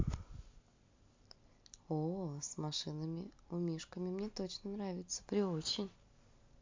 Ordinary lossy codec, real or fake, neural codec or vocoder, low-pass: MP3, 48 kbps; real; none; 7.2 kHz